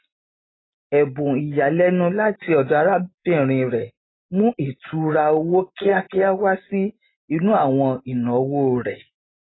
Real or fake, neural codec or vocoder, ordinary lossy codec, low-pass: real; none; AAC, 16 kbps; 7.2 kHz